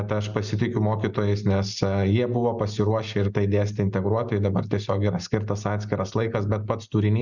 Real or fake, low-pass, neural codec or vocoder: real; 7.2 kHz; none